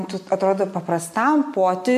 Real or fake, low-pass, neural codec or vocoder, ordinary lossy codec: real; 14.4 kHz; none; MP3, 64 kbps